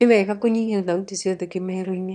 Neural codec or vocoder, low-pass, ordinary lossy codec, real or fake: autoencoder, 22.05 kHz, a latent of 192 numbers a frame, VITS, trained on one speaker; 9.9 kHz; none; fake